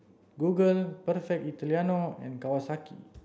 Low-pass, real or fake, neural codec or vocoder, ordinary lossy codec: none; real; none; none